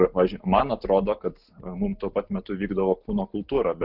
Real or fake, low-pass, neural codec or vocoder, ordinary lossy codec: real; 5.4 kHz; none; Opus, 32 kbps